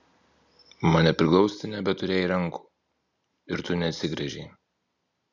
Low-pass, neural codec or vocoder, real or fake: 7.2 kHz; none; real